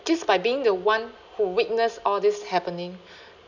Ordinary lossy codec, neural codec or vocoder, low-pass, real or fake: none; none; 7.2 kHz; real